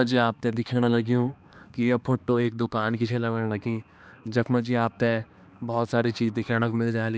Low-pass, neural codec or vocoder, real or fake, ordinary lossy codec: none; codec, 16 kHz, 2 kbps, X-Codec, HuBERT features, trained on balanced general audio; fake; none